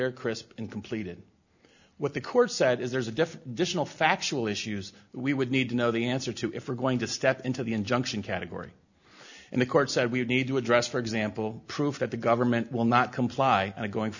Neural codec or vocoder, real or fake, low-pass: none; real; 7.2 kHz